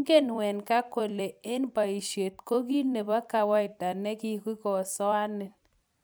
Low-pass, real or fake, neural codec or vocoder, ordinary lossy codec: none; fake; vocoder, 44.1 kHz, 128 mel bands every 256 samples, BigVGAN v2; none